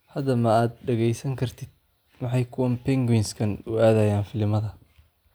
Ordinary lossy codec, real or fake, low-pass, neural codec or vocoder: none; real; none; none